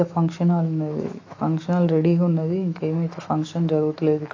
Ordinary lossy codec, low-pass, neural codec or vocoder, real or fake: AAC, 32 kbps; 7.2 kHz; autoencoder, 48 kHz, 128 numbers a frame, DAC-VAE, trained on Japanese speech; fake